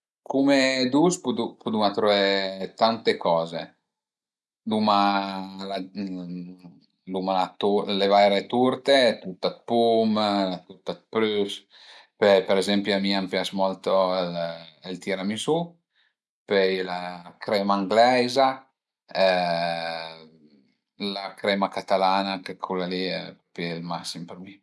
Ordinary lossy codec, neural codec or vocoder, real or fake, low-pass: none; none; real; none